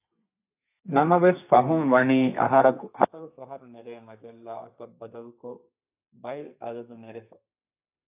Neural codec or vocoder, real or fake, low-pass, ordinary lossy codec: codec, 32 kHz, 1.9 kbps, SNAC; fake; 3.6 kHz; AAC, 32 kbps